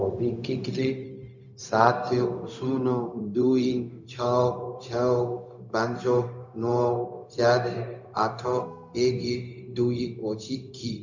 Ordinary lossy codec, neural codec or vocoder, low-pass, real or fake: none; codec, 16 kHz, 0.4 kbps, LongCat-Audio-Codec; 7.2 kHz; fake